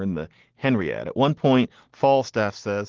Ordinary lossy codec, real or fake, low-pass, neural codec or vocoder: Opus, 16 kbps; real; 7.2 kHz; none